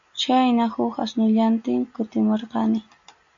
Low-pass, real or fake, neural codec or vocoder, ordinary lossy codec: 7.2 kHz; real; none; AAC, 64 kbps